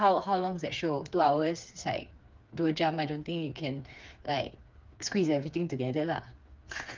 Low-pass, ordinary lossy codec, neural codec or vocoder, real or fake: 7.2 kHz; Opus, 32 kbps; codec, 16 kHz, 4 kbps, FreqCodec, smaller model; fake